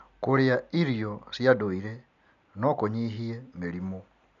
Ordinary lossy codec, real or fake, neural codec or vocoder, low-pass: none; real; none; 7.2 kHz